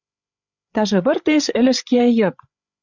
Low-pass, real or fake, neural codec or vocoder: 7.2 kHz; fake; codec, 16 kHz, 8 kbps, FreqCodec, larger model